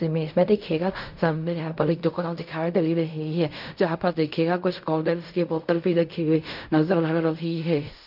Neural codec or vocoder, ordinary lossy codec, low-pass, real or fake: codec, 16 kHz in and 24 kHz out, 0.4 kbps, LongCat-Audio-Codec, fine tuned four codebook decoder; none; 5.4 kHz; fake